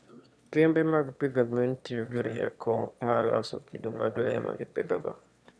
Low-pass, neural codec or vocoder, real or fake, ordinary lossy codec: none; autoencoder, 22.05 kHz, a latent of 192 numbers a frame, VITS, trained on one speaker; fake; none